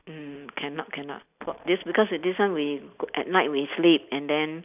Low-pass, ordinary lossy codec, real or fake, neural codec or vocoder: 3.6 kHz; none; real; none